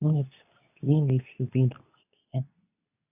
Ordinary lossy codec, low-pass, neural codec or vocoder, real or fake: none; 3.6 kHz; codec, 24 kHz, 0.9 kbps, WavTokenizer, medium speech release version 2; fake